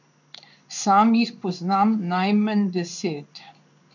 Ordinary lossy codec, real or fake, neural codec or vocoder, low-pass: none; fake; codec, 16 kHz in and 24 kHz out, 1 kbps, XY-Tokenizer; 7.2 kHz